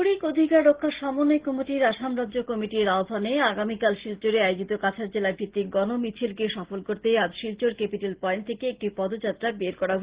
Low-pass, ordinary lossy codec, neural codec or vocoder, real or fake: 3.6 kHz; Opus, 16 kbps; none; real